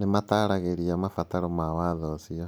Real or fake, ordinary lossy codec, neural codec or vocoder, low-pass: real; none; none; none